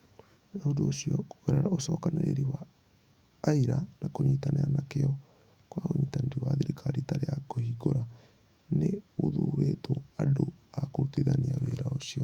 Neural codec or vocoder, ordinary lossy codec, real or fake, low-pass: autoencoder, 48 kHz, 128 numbers a frame, DAC-VAE, trained on Japanese speech; Opus, 64 kbps; fake; 19.8 kHz